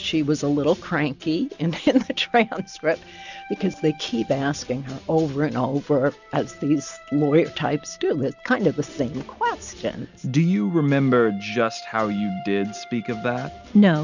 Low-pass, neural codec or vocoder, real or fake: 7.2 kHz; none; real